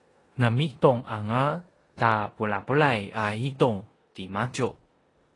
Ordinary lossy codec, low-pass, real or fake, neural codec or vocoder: AAC, 32 kbps; 10.8 kHz; fake; codec, 16 kHz in and 24 kHz out, 0.9 kbps, LongCat-Audio-Codec, four codebook decoder